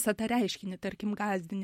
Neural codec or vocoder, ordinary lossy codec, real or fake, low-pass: none; MP3, 64 kbps; real; 19.8 kHz